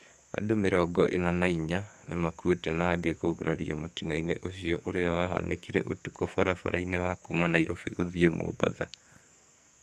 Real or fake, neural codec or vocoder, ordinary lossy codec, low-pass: fake; codec, 44.1 kHz, 2.6 kbps, SNAC; none; 14.4 kHz